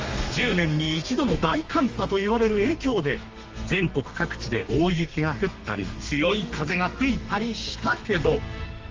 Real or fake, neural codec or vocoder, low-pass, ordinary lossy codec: fake; codec, 32 kHz, 1.9 kbps, SNAC; 7.2 kHz; Opus, 32 kbps